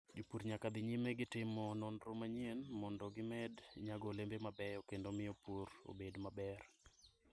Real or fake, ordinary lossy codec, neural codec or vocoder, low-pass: real; none; none; none